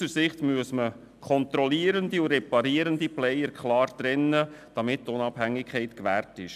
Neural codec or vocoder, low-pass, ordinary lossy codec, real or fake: none; 14.4 kHz; none; real